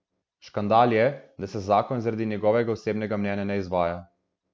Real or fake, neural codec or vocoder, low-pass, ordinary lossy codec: real; none; none; none